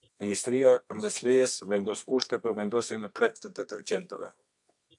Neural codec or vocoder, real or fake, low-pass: codec, 24 kHz, 0.9 kbps, WavTokenizer, medium music audio release; fake; 10.8 kHz